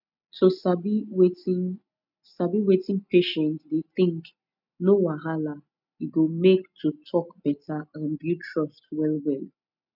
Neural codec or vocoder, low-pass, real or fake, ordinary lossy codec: none; 5.4 kHz; real; none